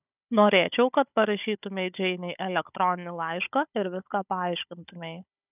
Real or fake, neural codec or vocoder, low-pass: fake; codec, 16 kHz, 16 kbps, FunCodec, trained on Chinese and English, 50 frames a second; 3.6 kHz